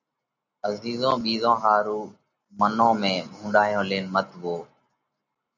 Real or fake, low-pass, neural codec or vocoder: real; 7.2 kHz; none